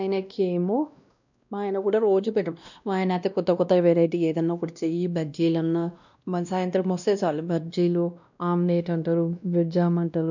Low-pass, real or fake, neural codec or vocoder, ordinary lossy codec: 7.2 kHz; fake; codec, 16 kHz, 1 kbps, X-Codec, WavLM features, trained on Multilingual LibriSpeech; none